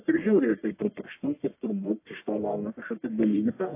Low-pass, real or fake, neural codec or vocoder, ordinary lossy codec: 3.6 kHz; fake; codec, 44.1 kHz, 1.7 kbps, Pupu-Codec; AAC, 24 kbps